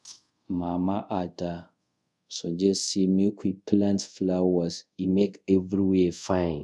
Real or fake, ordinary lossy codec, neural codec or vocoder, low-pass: fake; none; codec, 24 kHz, 0.5 kbps, DualCodec; none